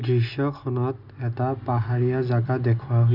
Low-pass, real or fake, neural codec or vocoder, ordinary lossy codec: 5.4 kHz; real; none; none